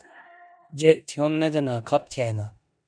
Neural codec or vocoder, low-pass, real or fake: codec, 16 kHz in and 24 kHz out, 0.9 kbps, LongCat-Audio-Codec, four codebook decoder; 9.9 kHz; fake